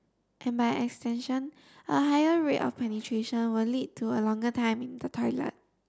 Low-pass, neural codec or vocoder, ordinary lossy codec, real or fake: none; none; none; real